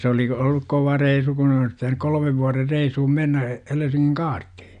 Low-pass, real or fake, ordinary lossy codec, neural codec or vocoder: 9.9 kHz; real; none; none